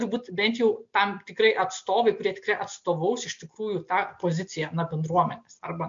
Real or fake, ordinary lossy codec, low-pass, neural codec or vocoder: real; MP3, 48 kbps; 7.2 kHz; none